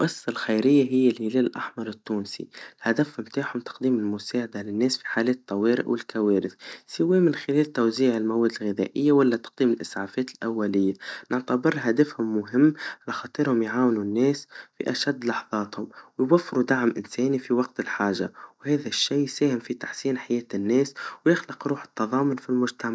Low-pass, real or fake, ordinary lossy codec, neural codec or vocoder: none; real; none; none